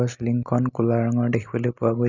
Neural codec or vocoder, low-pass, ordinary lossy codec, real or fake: none; 7.2 kHz; none; real